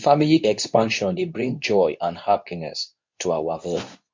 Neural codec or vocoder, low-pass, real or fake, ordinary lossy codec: codec, 24 kHz, 0.9 kbps, WavTokenizer, medium speech release version 2; 7.2 kHz; fake; MP3, 48 kbps